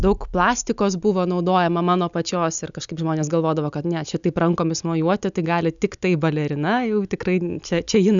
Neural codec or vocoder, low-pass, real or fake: none; 7.2 kHz; real